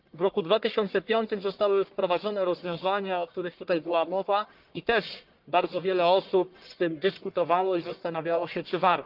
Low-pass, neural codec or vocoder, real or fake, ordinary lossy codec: 5.4 kHz; codec, 44.1 kHz, 1.7 kbps, Pupu-Codec; fake; Opus, 32 kbps